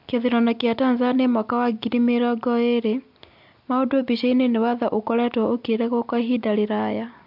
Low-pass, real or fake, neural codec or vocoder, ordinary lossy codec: 5.4 kHz; real; none; MP3, 48 kbps